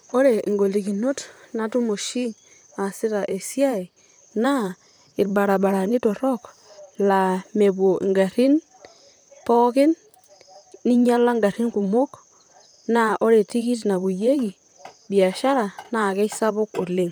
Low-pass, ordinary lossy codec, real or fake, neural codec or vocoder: none; none; fake; vocoder, 44.1 kHz, 128 mel bands, Pupu-Vocoder